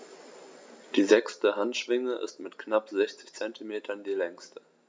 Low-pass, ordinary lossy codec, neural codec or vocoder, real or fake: none; none; none; real